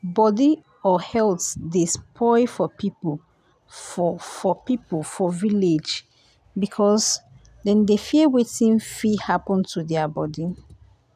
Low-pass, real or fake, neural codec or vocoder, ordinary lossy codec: 14.4 kHz; real; none; none